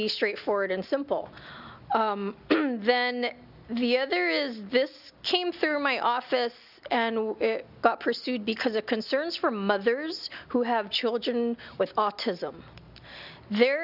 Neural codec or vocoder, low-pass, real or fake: none; 5.4 kHz; real